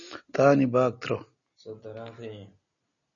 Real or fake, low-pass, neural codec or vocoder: real; 7.2 kHz; none